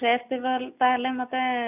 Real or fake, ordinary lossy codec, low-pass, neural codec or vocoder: real; none; 3.6 kHz; none